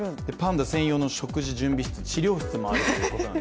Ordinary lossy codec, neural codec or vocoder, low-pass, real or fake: none; none; none; real